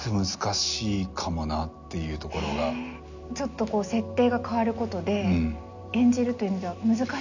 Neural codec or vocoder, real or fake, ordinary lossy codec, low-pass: none; real; none; 7.2 kHz